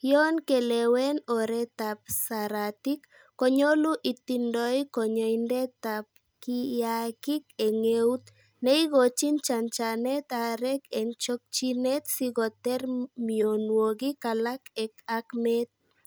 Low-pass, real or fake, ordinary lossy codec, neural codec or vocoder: none; real; none; none